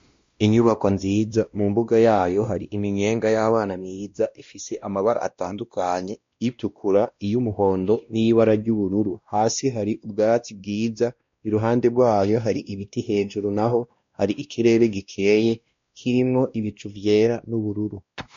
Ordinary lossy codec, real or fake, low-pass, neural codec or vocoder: MP3, 48 kbps; fake; 7.2 kHz; codec, 16 kHz, 1 kbps, X-Codec, WavLM features, trained on Multilingual LibriSpeech